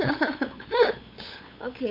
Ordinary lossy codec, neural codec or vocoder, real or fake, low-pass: MP3, 32 kbps; codec, 16 kHz, 8 kbps, FunCodec, trained on LibriTTS, 25 frames a second; fake; 5.4 kHz